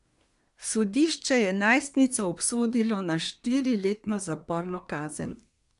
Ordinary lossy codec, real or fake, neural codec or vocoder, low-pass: none; fake; codec, 24 kHz, 1 kbps, SNAC; 10.8 kHz